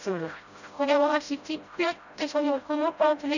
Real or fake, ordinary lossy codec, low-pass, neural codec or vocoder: fake; none; 7.2 kHz; codec, 16 kHz, 0.5 kbps, FreqCodec, smaller model